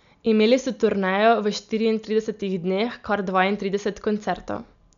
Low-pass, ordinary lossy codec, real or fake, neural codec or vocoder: 7.2 kHz; none; real; none